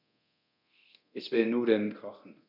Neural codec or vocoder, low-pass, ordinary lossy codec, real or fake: codec, 24 kHz, 0.9 kbps, DualCodec; 5.4 kHz; none; fake